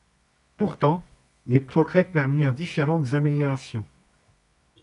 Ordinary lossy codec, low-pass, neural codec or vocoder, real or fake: MP3, 96 kbps; 10.8 kHz; codec, 24 kHz, 0.9 kbps, WavTokenizer, medium music audio release; fake